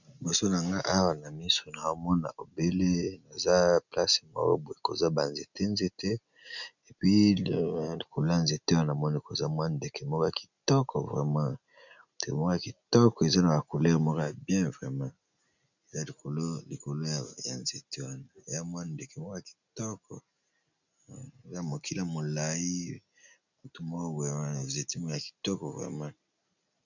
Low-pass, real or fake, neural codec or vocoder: 7.2 kHz; real; none